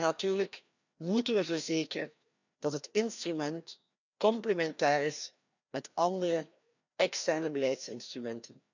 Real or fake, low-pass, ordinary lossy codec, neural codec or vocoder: fake; 7.2 kHz; none; codec, 16 kHz, 1 kbps, FreqCodec, larger model